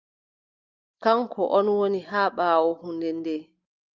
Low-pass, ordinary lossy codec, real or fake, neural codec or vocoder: 7.2 kHz; Opus, 24 kbps; real; none